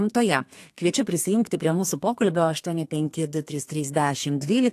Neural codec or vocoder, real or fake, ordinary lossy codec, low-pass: codec, 32 kHz, 1.9 kbps, SNAC; fake; AAC, 64 kbps; 14.4 kHz